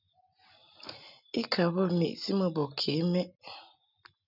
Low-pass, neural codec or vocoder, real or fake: 5.4 kHz; none; real